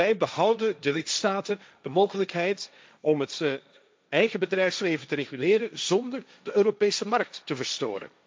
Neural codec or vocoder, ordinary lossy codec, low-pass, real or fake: codec, 16 kHz, 1.1 kbps, Voila-Tokenizer; none; none; fake